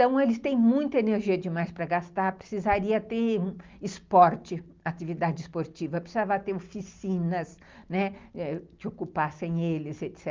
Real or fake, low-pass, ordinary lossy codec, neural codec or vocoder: real; 7.2 kHz; Opus, 24 kbps; none